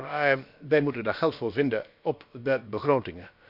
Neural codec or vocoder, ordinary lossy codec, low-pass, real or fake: codec, 16 kHz, about 1 kbps, DyCAST, with the encoder's durations; none; 5.4 kHz; fake